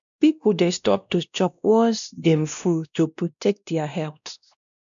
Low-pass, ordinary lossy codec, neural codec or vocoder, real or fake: 7.2 kHz; none; codec, 16 kHz, 1 kbps, X-Codec, WavLM features, trained on Multilingual LibriSpeech; fake